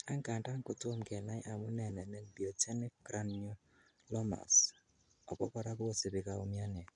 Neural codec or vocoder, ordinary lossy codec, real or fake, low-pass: none; MP3, 64 kbps; real; 9.9 kHz